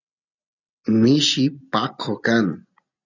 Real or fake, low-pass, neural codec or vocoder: real; 7.2 kHz; none